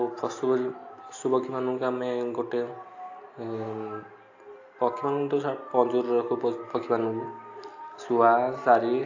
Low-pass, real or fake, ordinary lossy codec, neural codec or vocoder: 7.2 kHz; real; AAC, 48 kbps; none